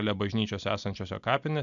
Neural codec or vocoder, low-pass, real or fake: none; 7.2 kHz; real